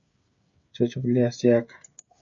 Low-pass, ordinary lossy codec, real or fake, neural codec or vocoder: 7.2 kHz; MP3, 64 kbps; fake; codec, 16 kHz, 16 kbps, FreqCodec, smaller model